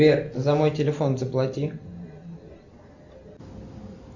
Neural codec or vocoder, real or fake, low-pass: none; real; 7.2 kHz